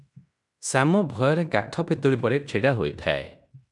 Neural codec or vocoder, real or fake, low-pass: codec, 16 kHz in and 24 kHz out, 0.9 kbps, LongCat-Audio-Codec, fine tuned four codebook decoder; fake; 10.8 kHz